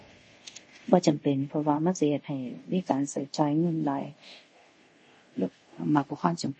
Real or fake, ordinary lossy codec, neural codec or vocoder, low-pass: fake; MP3, 32 kbps; codec, 24 kHz, 0.5 kbps, DualCodec; 10.8 kHz